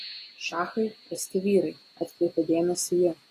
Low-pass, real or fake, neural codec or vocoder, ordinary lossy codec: 14.4 kHz; real; none; AAC, 48 kbps